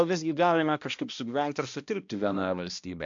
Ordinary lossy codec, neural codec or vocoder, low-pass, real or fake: AAC, 64 kbps; codec, 16 kHz, 1 kbps, X-Codec, HuBERT features, trained on balanced general audio; 7.2 kHz; fake